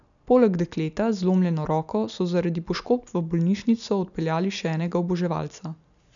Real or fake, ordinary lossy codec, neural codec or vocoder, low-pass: real; none; none; 7.2 kHz